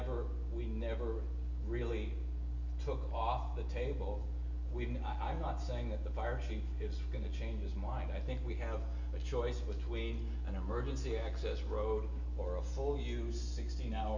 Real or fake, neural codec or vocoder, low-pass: real; none; 7.2 kHz